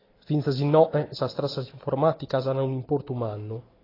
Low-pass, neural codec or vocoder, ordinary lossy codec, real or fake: 5.4 kHz; none; AAC, 24 kbps; real